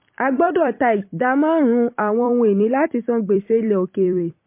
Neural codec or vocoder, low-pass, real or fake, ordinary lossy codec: vocoder, 44.1 kHz, 128 mel bands every 256 samples, BigVGAN v2; 3.6 kHz; fake; MP3, 24 kbps